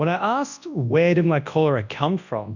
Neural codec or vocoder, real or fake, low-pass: codec, 24 kHz, 0.9 kbps, WavTokenizer, large speech release; fake; 7.2 kHz